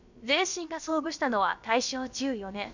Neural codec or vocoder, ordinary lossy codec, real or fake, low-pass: codec, 16 kHz, about 1 kbps, DyCAST, with the encoder's durations; none; fake; 7.2 kHz